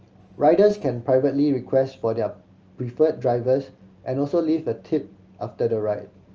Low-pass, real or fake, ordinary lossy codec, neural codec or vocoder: 7.2 kHz; real; Opus, 24 kbps; none